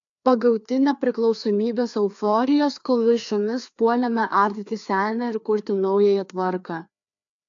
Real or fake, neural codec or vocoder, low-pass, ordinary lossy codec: fake; codec, 16 kHz, 2 kbps, FreqCodec, larger model; 7.2 kHz; AAC, 64 kbps